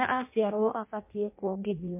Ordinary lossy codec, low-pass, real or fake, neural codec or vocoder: MP3, 24 kbps; 3.6 kHz; fake; codec, 16 kHz in and 24 kHz out, 0.6 kbps, FireRedTTS-2 codec